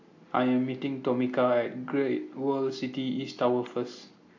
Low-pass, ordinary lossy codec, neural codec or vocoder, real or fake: 7.2 kHz; AAC, 48 kbps; none; real